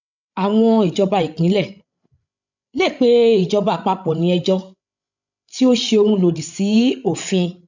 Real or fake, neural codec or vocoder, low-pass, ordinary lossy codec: fake; codec, 16 kHz, 16 kbps, FreqCodec, larger model; 7.2 kHz; none